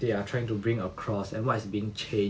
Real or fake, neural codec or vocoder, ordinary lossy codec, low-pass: real; none; none; none